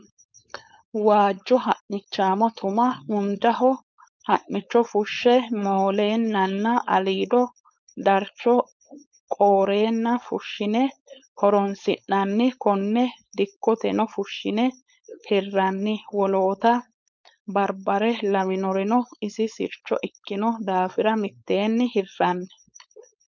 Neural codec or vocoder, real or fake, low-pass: codec, 16 kHz, 4.8 kbps, FACodec; fake; 7.2 kHz